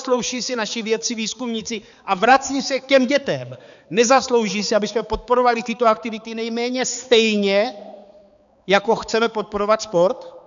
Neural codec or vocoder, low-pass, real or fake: codec, 16 kHz, 4 kbps, X-Codec, HuBERT features, trained on balanced general audio; 7.2 kHz; fake